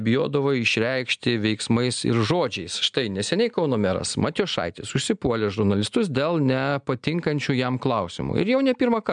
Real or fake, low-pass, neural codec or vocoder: real; 9.9 kHz; none